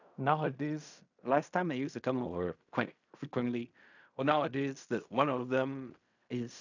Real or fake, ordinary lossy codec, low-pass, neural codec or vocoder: fake; none; 7.2 kHz; codec, 16 kHz in and 24 kHz out, 0.4 kbps, LongCat-Audio-Codec, fine tuned four codebook decoder